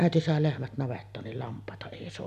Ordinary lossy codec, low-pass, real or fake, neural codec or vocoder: none; 14.4 kHz; real; none